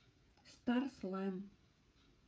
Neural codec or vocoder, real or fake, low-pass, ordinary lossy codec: codec, 16 kHz, 16 kbps, FreqCodec, smaller model; fake; none; none